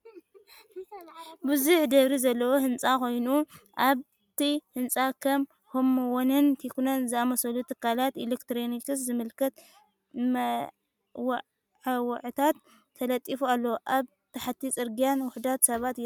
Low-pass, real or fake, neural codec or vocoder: 19.8 kHz; real; none